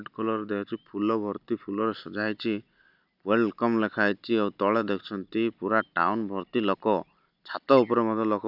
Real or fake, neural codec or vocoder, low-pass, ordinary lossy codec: real; none; 5.4 kHz; none